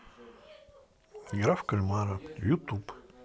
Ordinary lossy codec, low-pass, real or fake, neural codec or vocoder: none; none; real; none